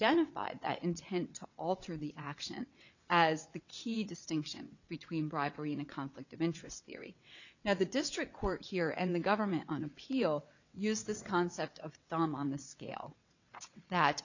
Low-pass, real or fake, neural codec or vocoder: 7.2 kHz; fake; vocoder, 22.05 kHz, 80 mel bands, WaveNeXt